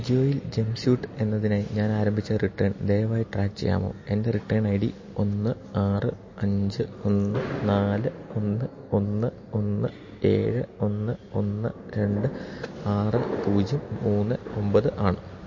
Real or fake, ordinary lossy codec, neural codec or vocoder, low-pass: real; MP3, 32 kbps; none; 7.2 kHz